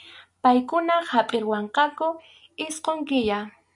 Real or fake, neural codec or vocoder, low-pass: real; none; 10.8 kHz